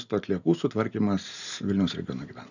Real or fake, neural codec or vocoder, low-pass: real; none; 7.2 kHz